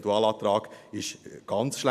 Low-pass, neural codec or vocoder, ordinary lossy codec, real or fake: 14.4 kHz; none; none; real